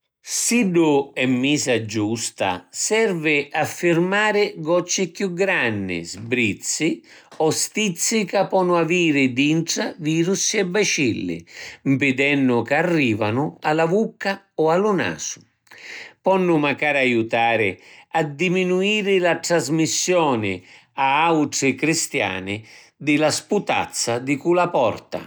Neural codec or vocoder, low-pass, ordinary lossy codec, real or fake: none; none; none; real